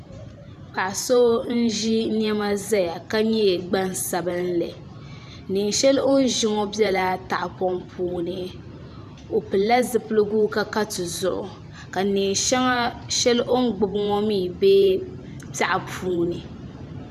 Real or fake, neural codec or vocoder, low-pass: fake; vocoder, 44.1 kHz, 128 mel bands every 512 samples, BigVGAN v2; 14.4 kHz